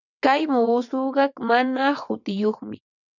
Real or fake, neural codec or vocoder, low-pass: fake; vocoder, 22.05 kHz, 80 mel bands, WaveNeXt; 7.2 kHz